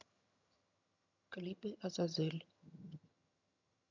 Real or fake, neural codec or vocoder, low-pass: fake; vocoder, 22.05 kHz, 80 mel bands, HiFi-GAN; 7.2 kHz